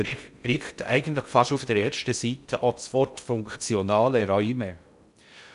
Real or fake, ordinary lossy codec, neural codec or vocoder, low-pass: fake; none; codec, 16 kHz in and 24 kHz out, 0.6 kbps, FocalCodec, streaming, 2048 codes; 10.8 kHz